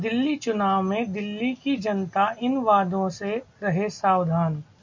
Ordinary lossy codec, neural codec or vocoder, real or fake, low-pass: MP3, 48 kbps; none; real; 7.2 kHz